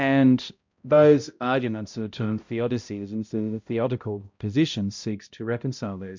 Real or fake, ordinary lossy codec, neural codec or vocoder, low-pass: fake; MP3, 64 kbps; codec, 16 kHz, 0.5 kbps, X-Codec, HuBERT features, trained on balanced general audio; 7.2 kHz